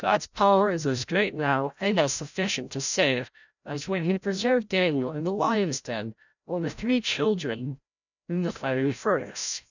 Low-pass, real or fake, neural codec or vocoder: 7.2 kHz; fake; codec, 16 kHz, 0.5 kbps, FreqCodec, larger model